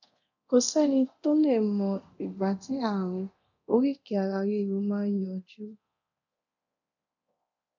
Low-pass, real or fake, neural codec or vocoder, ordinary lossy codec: 7.2 kHz; fake; codec, 24 kHz, 0.9 kbps, DualCodec; none